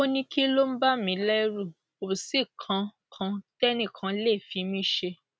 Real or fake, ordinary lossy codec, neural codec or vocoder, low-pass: real; none; none; none